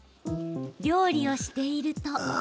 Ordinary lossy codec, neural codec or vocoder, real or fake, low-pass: none; none; real; none